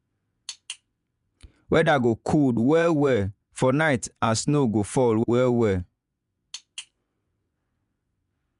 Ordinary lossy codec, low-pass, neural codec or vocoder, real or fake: none; 10.8 kHz; vocoder, 24 kHz, 100 mel bands, Vocos; fake